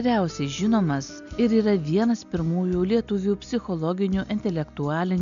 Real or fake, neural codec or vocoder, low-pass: real; none; 7.2 kHz